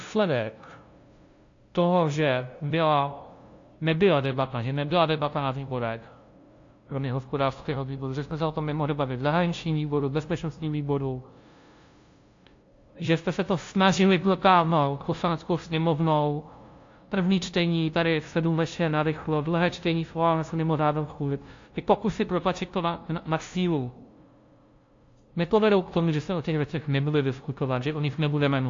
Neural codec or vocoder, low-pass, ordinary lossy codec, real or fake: codec, 16 kHz, 0.5 kbps, FunCodec, trained on LibriTTS, 25 frames a second; 7.2 kHz; AAC, 48 kbps; fake